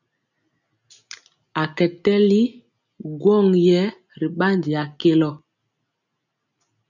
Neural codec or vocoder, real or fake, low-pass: none; real; 7.2 kHz